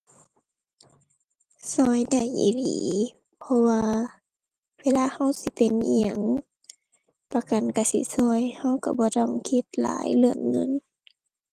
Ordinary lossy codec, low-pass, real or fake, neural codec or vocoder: Opus, 24 kbps; 14.4 kHz; fake; vocoder, 44.1 kHz, 128 mel bands every 256 samples, BigVGAN v2